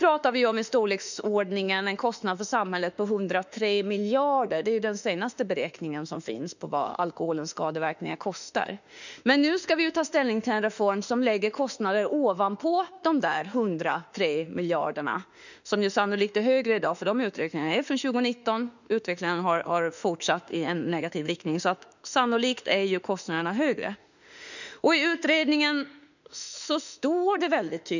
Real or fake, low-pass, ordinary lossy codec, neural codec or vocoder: fake; 7.2 kHz; none; autoencoder, 48 kHz, 32 numbers a frame, DAC-VAE, trained on Japanese speech